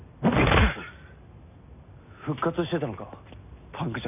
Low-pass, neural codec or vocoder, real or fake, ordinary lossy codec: 3.6 kHz; none; real; none